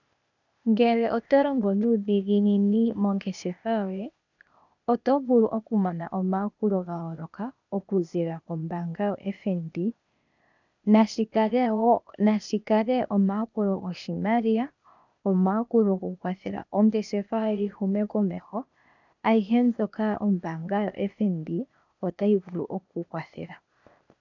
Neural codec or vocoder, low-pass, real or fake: codec, 16 kHz, 0.8 kbps, ZipCodec; 7.2 kHz; fake